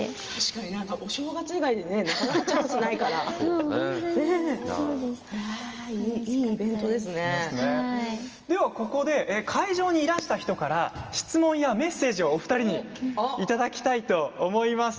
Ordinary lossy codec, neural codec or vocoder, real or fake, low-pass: Opus, 24 kbps; none; real; 7.2 kHz